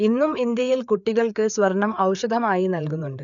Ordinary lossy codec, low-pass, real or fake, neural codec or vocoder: none; 7.2 kHz; fake; codec, 16 kHz, 4 kbps, FreqCodec, larger model